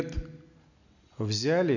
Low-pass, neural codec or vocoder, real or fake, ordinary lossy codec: 7.2 kHz; none; real; none